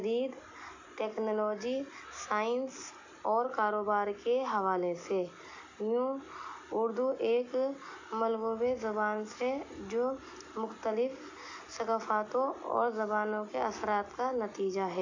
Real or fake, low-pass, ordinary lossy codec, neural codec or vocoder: real; 7.2 kHz; AAC, 48 kbps; none